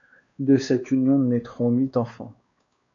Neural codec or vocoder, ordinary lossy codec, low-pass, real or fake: codec, 16 kHz, 2 kbps, X-Codec, WavLM features, trained on Multilingual LibriSpeech; MP3, 64 kbps; 7.2 kHz; fake